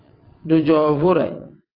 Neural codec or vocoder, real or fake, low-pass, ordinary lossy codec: vocoder, 22.05 kHz, 80 mel bands, WaveNeXt; fake; 5.4 kHz; AAC, 24 kbps